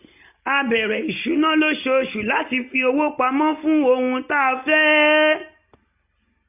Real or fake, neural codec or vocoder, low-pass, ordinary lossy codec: real; none; 3.6 kHz; none